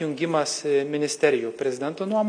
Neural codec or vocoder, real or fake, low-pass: none; real; 9.9 kHz